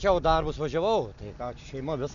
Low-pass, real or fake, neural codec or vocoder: 7.2 kHz; real; none